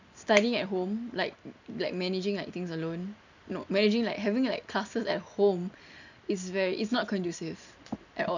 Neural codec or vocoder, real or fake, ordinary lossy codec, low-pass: none; real; none; 7.2 kHz